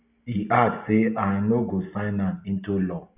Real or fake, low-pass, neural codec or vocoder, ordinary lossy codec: real; 3.6 kHz; none; none